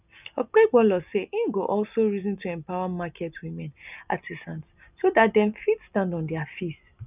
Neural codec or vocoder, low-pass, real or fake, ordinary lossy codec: none; 3.6 kHz; real; none